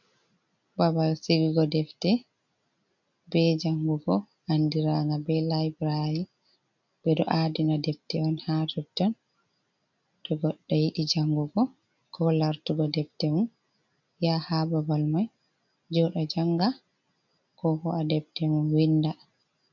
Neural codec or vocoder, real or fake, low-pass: none; real; 7.2 kHz